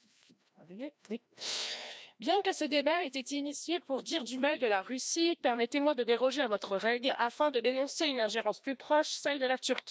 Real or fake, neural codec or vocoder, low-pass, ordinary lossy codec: fake; codec, 16 kHz, 1 kbps, FreqCodec, larger model; none; none